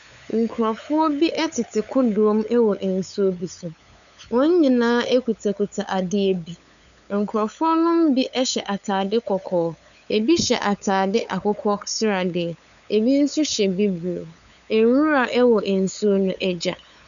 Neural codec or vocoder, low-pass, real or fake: codec, 16 kHz, 8 kbps, FunCodec, trained on LibriTTS, 25 frames a second; 7.2 kHz; fake